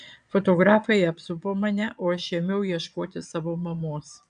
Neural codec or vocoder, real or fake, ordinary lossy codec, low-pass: vocoder, 22.05 kHz, 80 mel bands, Vocos; fake; AAC, 96 kbps; 9.9 kHz